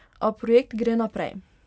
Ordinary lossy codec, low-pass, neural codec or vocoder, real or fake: none; none; none; real